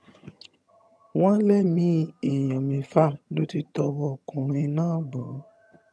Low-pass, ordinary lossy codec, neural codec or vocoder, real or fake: none; none; vocoder, 22.05 kHz, 80 mel bands, HiFi-GAN; fake